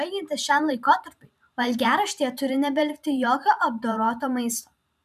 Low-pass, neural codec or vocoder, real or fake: 14.4 kHz; vocoder, 44.1 kHz, 128 mel bands every 512 samples, BigVGAN v2; fake